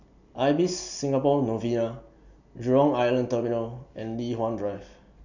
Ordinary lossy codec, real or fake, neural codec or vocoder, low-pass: none; real; none; 7.2 kHz